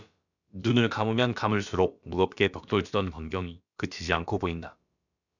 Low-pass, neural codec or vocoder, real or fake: 7.2 kHz; codec, 16 kHz, about 1 kbps, DyCAST, with the encoder's durations; fake